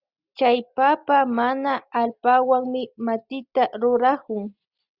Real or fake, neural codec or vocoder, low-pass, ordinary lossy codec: real; none; 5.4 kHz; Opus, 64 kbps